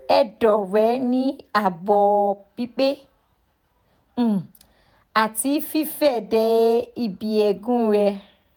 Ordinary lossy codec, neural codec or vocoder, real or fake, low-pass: none; vocoder, 48 kHz, 128 mel bands, Vocos; fake; none